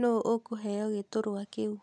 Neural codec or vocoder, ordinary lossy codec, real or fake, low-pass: none; none; real; none